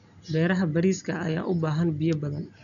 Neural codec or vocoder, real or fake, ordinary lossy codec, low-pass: none; real; none; 7.2 kHz